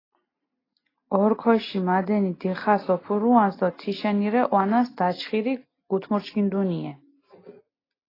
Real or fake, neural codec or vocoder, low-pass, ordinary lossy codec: real; none; 5.4 kHz; AAC, 24 kbps